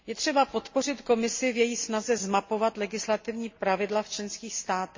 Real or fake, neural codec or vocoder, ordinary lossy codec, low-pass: real; none; MP3, 32 kbps; 7.2 kHz